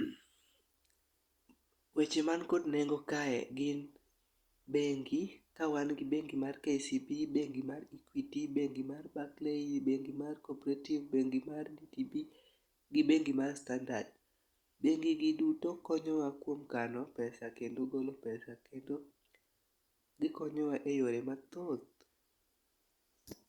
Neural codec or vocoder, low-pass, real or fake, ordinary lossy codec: none; 19.8 kHz; real; Opus, 64 kbps